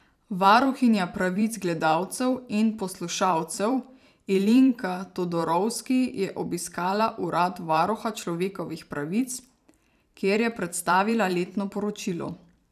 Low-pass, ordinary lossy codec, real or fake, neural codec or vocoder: 14.4 kHz; none; fake; vocoder, 44.1 kHz, 128 mel bands every 512 samples, BigVGAN v2